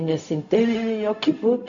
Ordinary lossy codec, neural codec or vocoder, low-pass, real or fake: AAC, 64 kbps; codec, 16 kHz, 0.4 kbps, LongCat-Audio-Codec; 7.2 kHz; fake